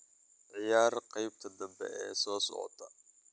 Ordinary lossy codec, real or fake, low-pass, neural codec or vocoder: none; real; none; none